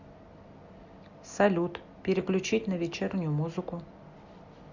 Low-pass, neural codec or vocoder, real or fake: 7.2 kHz; none; real